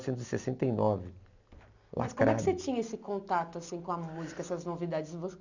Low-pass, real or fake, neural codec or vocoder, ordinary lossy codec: 7.2 kHz; real; none; none